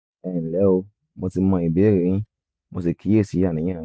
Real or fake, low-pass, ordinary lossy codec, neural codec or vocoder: real; none; none; none